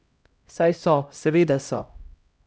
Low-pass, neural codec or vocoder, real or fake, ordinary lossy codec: none; codec, 16 kHz, 0.5 kbps, X-Codec, HuBERT features, trained on LibriSpeech; fake; none